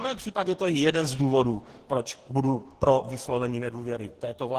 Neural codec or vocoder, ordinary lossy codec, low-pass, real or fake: codec, 44.1 kHz, 2.6 kbps, DAC; Opus, 16 kbps; 14.4 kHz; fake